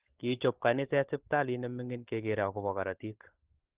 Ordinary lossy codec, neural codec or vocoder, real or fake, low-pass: Opus, 16 kbps; codec, 16 kHz, 16 kbps, FunCodec, trained on LibriTTS, 50 frames a second; fake; 3.6 kHz